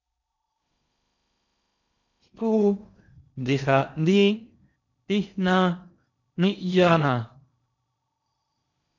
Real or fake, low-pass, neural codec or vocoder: fake; 7.2 kHz; codec, 16 kHz in and 24 kHz out, 0.6 kbps, FocalCodec, streaming, 4096 codes